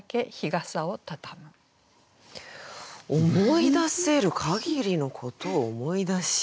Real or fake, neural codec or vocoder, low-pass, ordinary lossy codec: real; none; none; none